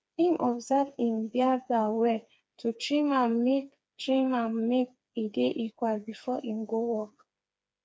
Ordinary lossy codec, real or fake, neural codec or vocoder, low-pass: none; fake; codec, 16 kHz, 4 kbps, FreqCodec, smaller model; none